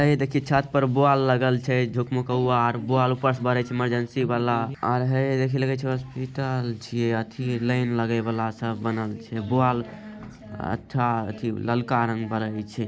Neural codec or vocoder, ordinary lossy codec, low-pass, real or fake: none; none; none; real